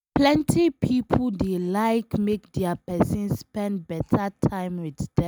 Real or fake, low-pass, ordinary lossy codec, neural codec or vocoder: real; none; none; none